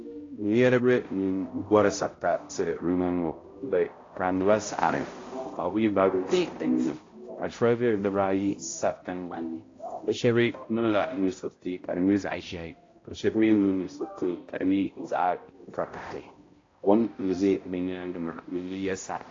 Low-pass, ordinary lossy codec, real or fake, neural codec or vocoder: 7.2 kHz; AAC, 32 kbps; fake; codec, 16 kHz, 0.5 kbps, X-Codec, HuBERT features, trained on balanced general audio